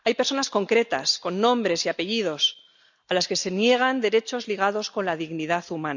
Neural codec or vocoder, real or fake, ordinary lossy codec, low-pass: none; real; none; 7.2 kHz